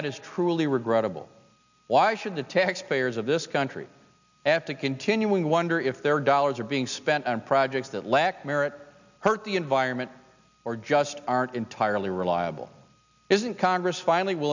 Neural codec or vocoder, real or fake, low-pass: none; real; 7.2 kHz